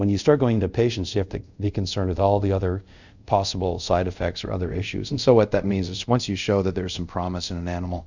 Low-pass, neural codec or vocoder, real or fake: 7.2 kHz; codec, 24 kHz, 0.5 kbps, DualCodec; fake